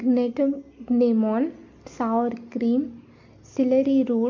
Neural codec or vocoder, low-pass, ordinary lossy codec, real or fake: none; 7.2 kHz; MP3, 48 kbps; real